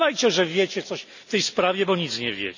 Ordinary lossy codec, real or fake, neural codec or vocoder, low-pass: none; real; none; 7.2 kHz